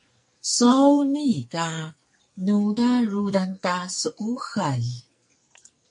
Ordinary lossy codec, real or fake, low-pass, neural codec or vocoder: MP3, 48 kbps; fake; 10.8 kHz; codec, 44.1 kHz, 2.6 kbps, SNAC